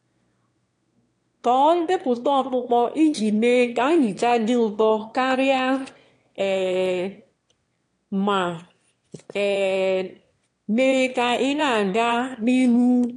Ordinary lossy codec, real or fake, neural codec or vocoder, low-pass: AAC, 48 kbps; fake; autoencoder, 22.05 kHz, a latent of 192 numbers a frame, VITS, trained on one speaker; 9.9 kHz